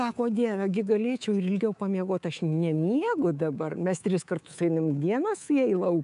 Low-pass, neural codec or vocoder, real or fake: 10.8 kHz; codec, 24 kHz, 3.1 kbps, DualCodec; fake